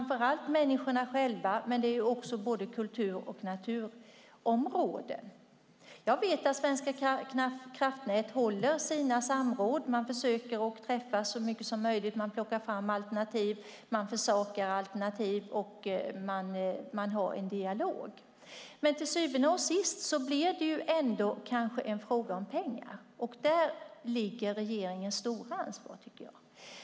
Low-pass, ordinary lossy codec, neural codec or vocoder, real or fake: none; none; none; real